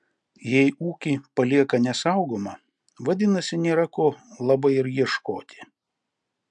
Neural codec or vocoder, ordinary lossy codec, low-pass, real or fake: none; MP3, 96 kbps; 9.9 kHz; real